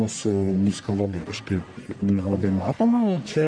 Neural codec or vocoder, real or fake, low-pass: codec, 44.1 kHz, 1.7 kbps, Pupu-Codec; fake; 9.9 kHz